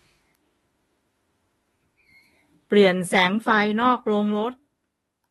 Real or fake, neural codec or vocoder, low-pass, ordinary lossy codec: fake; autoencoder, 48 kHz, 32 numbers a frame, DAC-VAE, trained on Japanese speech; 19.8 kHz; AAC, 32 kbps